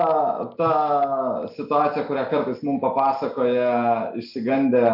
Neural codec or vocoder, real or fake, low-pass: none; real; 5.4 kHz